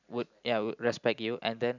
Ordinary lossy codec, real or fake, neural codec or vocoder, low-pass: none; real; none; 7.2 kHz